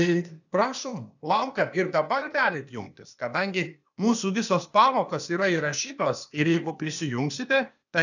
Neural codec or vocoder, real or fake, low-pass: codec, 16 kHz, 0.8 kbps, ZipCodec; fake; 7.2 kHz